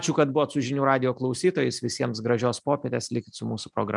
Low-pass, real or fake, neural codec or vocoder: 10.8 kHz; real; none